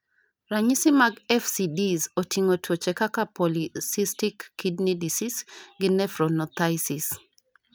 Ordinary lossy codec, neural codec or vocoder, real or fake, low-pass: none; none; real; none